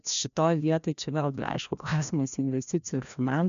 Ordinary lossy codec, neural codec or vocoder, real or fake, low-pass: MP3, 96 kbps; codec, 16 kHz, 1 kbps, FreqCodec, larger model; fake; 7.2 kHz